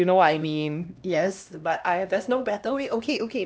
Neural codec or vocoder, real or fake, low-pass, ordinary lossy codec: codec, 16 kHz, 1 kbps, X-Codec, HuBERT features, trained on LibriSpeech; fake; none; none